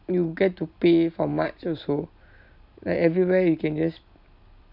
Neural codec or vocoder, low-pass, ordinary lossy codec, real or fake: none; 5.4 kHz; none; real